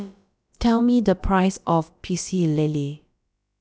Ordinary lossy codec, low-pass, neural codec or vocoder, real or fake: none; none; codec, 16 kHz, about 1 kbps, DyCAST, with the encoder's durations; fake